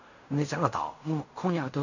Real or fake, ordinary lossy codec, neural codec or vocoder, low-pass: fake; none; codec, 16 kHz in and 24 kHz out, 0.4 kbps, LongCat-Audio-Codec, fine tuned four codebook decoder; 7.2 kHz